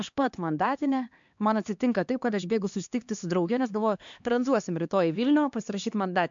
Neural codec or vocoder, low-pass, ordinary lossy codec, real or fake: codec, 16 kHz, 2 kbps, X-Codec, HuBERT features, trained on LibriSpeech; 7.2 kHz; MP3, 64 kbps; fake